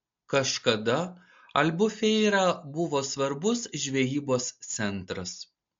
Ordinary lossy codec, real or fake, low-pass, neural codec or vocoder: MP3, 48 kbps; real; 7.2 kHz; none